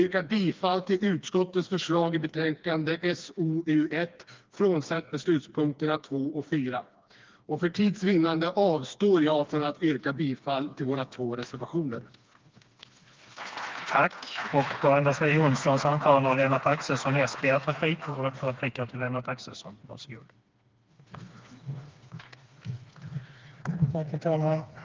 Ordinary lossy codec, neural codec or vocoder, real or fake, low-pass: Opus, 32 kbps; codec, 16 kHz, 2 kbps, FreqCodec, smaller model; fake; 7.2 kHz